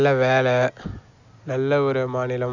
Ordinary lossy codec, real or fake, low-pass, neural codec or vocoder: none; real; 7.2 kHz; none